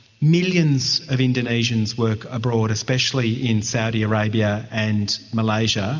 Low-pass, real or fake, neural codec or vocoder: 7.2 kHz; real; none